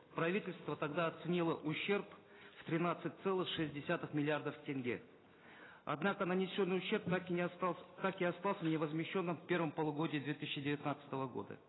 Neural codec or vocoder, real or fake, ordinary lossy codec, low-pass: none; real; AAC, 16 kbps; 7.2 kHz